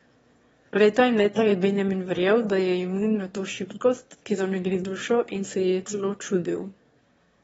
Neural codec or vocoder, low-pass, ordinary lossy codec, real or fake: autoencoder, 22.05 kHz, a latent of 192 numbers a frame, VITS, trained on one speaker; 9.9 kHz; AAC, 24 kbps; fake